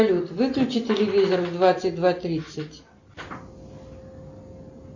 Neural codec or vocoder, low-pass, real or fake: none; 7.2 kHz; real